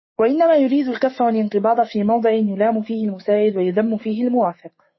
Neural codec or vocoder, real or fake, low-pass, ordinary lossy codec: autoencoder, 48 kHz, 128 numbers a frame, DAC-VAE, trained on Japanese speech; fake; 7.2 kHz; MP3, 24 kbps